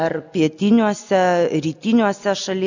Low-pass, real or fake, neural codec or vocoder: 7.2 kHz; real; none